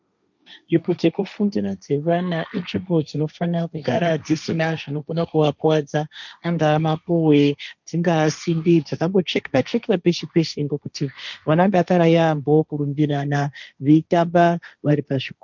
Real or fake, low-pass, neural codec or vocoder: fake; 7.2 kHz; codec, 16 kHz, 1.1 kbps, Voila-Tokenizer